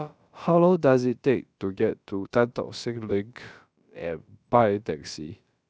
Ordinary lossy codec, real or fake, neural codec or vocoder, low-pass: none; fake; codec, 16 kHz, about 1 kbps, DyCAST, with the encoder's durations; none